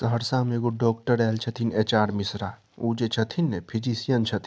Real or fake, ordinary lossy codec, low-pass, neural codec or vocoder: real; none; none; none